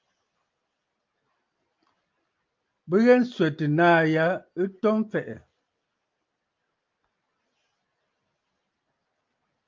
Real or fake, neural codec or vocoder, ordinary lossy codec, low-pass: real; none; Opus, 24 kbps; 7.2 kHz